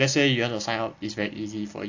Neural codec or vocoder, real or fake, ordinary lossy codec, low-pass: none; real; AAC, 48 kbps; 7.2 kHz